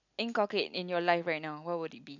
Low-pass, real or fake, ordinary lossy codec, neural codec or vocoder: 7.2 kHz; real; none; none